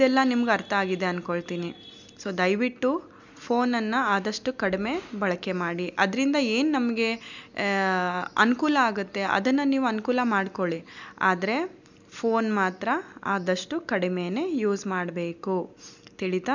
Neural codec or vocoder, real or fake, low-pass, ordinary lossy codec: none; real; 7.2 kHz; none